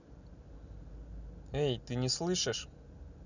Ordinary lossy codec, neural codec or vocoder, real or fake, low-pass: none; none; real; 7.2 kHz